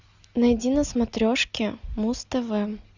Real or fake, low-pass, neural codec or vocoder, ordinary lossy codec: real; 7.2 kHz; none; Opus, 64 kbps